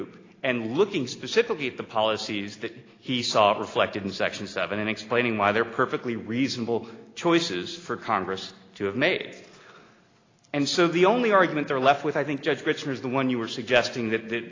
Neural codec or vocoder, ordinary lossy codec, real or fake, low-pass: none; AAC, 32 kbps; real; 7.2 kHz